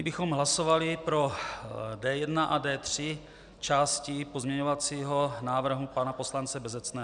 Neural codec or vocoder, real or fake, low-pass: none; real; 9.9 kHz